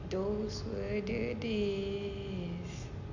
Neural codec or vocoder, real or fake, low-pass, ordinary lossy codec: none; real; 7.2 kHz; MP3, 64 kbps